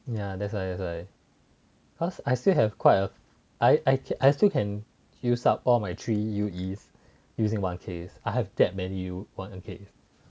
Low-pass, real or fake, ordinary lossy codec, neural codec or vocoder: none; real; none; none